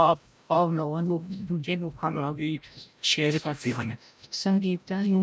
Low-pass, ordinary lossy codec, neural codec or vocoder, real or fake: none; none; codec, 16 kHz, 0.5 kbps, FreqCodec, larger model; fake